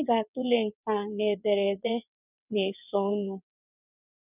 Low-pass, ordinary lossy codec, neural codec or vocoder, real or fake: 3.6 kHz; none; vocoder, 22.05 kHz, 80 mel bands, WaveNeXt; fake